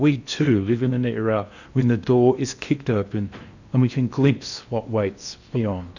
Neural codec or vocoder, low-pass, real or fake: codec, 16 kHz in and 24 kHz out, 0.8 kbps, FocalCodec, streaming, 65536 codes; 7.2 kHz; fake